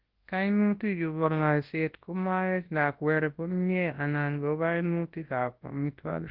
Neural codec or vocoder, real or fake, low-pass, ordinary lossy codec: codec, 24 kHz, 0.9 kbps, WavTokenizer, large speech release; fake; 5.4 kHz; Opus, 16 kbps